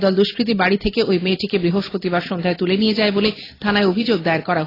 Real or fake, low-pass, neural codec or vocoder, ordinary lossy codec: real; 5.4 kHz; none; AAC, 24 kbps